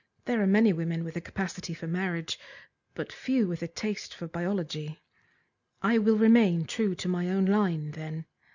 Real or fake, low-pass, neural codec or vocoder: real; 7.2 kHz; none